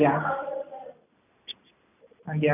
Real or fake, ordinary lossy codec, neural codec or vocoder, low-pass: real; none; none; 3.6 kHz